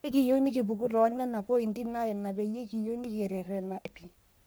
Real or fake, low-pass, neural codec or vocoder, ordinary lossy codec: fake; none; codec, 44.1 kHz, 3.4 kbps, Pupu-Codec; none